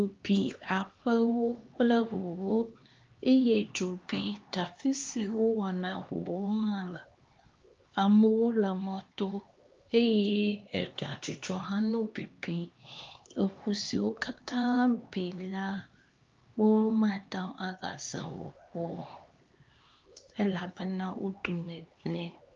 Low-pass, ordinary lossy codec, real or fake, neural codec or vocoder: 7.2 kHz; Opus, 32 kbps; fake; codec, 16 kHz, 2 kbps, X-Codec, HuBERT features, trained on LibriSpeech